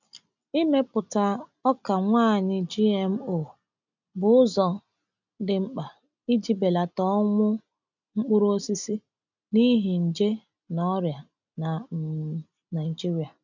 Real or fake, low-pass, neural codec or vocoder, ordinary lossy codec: real; 7.2 kHz; none; none